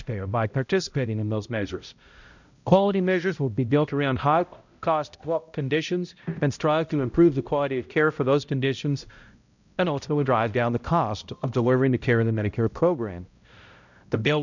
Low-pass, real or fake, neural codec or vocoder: 7.2 kHz; fake; codec, 16 kHz, 0.5 kbps, X-Codec, HuBERT features, trained on balanced general audio